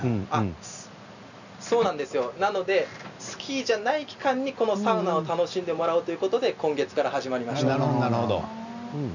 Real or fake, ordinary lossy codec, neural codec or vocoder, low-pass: real; none; none; 7.2 kHz